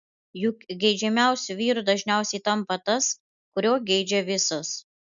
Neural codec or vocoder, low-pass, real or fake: none; 7.2 kHz; real